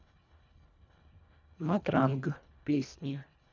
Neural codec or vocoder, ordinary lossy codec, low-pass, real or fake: codec, 24 kHz, 1.5 kbps, HILCodec; none; 7.2 kHz; fake